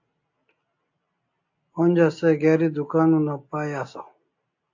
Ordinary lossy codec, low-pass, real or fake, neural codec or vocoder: AAC, 48 kbps; 7.2 kHz; real; none